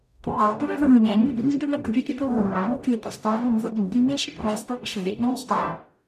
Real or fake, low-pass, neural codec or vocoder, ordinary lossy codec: fake; 14.4 kHz; codec, 44.1 kHz, 0.9 kbps, DAC; none